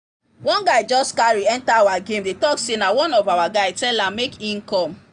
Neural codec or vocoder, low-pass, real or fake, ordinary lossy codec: none; 9.9 kHz; real; none